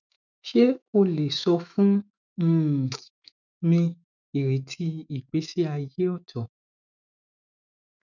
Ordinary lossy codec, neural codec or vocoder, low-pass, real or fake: none; autoencoder, 48 kHz, 128 numbers a frame, DAC-VAE, trained on Japanese speech; 7.2 kHz; fake